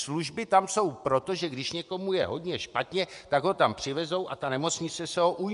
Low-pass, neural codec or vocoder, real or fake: 10.8 kHz; vocoder, 24 kHz, 100 mel bands, Vocos; fake